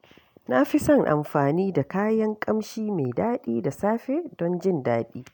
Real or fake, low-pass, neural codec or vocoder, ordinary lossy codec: real; 19.8 kHz; none; none